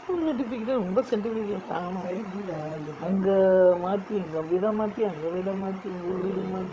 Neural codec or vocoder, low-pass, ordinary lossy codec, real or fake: codec, 16 kHz, 16 kbps, FreqCodec, larger model; none; none; fake